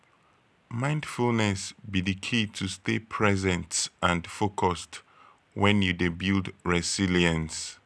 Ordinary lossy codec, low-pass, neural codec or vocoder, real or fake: none; none; none; real